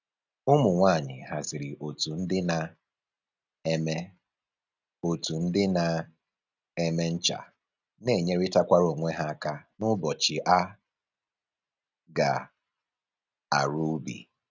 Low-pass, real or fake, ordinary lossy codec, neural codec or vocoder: 7.2 kHz; real; none; none